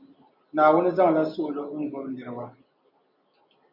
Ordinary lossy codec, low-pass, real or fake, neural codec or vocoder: MP3, 48 kbps; 5.4 kHz; real; none